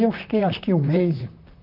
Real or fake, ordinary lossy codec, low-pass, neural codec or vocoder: fake; none; 5.4 kHz; vocoder, 44.1 kHz, 128 mel bands, Pupu-Vocoder